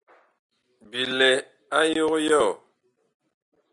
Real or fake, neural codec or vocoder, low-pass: real; none; 10.8 kHz